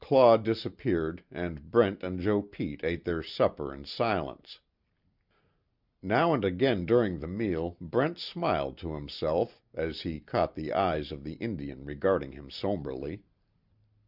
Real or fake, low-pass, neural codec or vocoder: real; 5.4 kHz; none